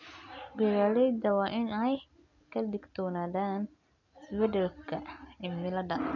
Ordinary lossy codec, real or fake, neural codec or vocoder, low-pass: none; real; none; 7.2 kHz